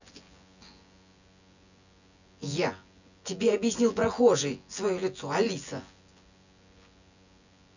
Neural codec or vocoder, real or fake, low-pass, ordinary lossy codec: vocoder, 24 kHz, 100 mel bands, Vocos; fake; 7.2 kHz; none